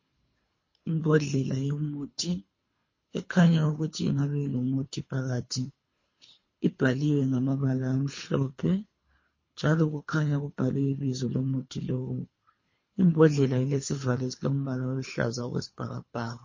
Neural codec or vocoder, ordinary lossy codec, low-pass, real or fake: codec, 24 kHz, 3 kbps, HILCodec; MP3, 32 kbps; 7.2 kHz; fake